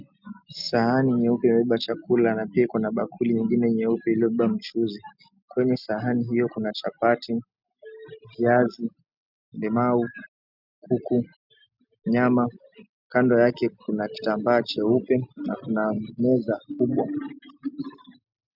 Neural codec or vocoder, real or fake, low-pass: none; real; 5.4 kHz